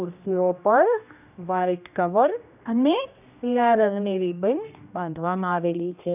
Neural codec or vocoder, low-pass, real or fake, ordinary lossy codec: codec, 16 kHz, 1 kbps, X-Codec, HuBERT features, trained on general audio; 3.6 kHz; fake; none